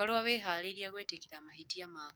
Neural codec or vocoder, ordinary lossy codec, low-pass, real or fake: codec, 44.1 kHz, 7.8 kbps, DAC; none; none; fake